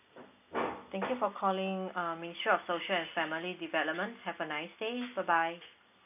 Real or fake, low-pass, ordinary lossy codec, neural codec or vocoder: real; 3.6 kHz; none; none